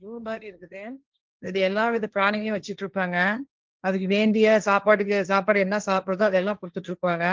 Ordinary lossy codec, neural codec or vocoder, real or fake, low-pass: Opus, 32 kbps; codec, 16 kHz, 1.1 kbps, Voila-Tokenizer; fake; 7.2 kHz